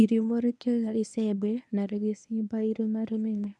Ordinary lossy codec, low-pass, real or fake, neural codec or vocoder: none; none; fake; codec, 24 kHz, 0.9 kbps, WavTokenizer, medium speech release version 1